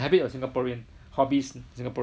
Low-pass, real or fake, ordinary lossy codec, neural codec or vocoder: none; real; none; none